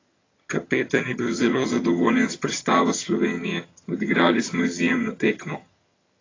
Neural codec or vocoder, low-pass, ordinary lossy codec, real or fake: vocoder, 22.05 kHz, 80 mel bands, HiFi-GAN; 7.2 kHz; AAC, 32 kbps; fake